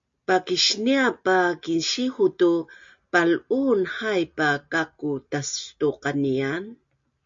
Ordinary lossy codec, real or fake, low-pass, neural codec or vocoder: MP3, 48 kbps; real; 7.2 kHz; none